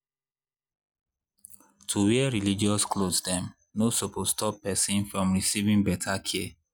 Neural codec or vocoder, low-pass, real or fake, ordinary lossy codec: none; none; real; none